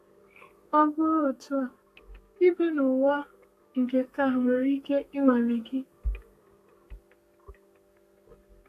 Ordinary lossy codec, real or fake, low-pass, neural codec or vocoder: AAC, 64 kbps; fake; 14.4 kHz; codec, 32 kHz, 1.9 kbps, SNAC